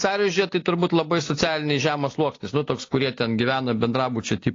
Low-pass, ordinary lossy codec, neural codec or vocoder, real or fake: 7.2 kHz; AAC, 32 kbps; none; real